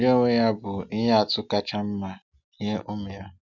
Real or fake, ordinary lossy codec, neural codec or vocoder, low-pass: real; none; none; 7.2 kHz